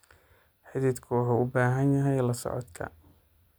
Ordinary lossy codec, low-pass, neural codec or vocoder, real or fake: none; none; none; real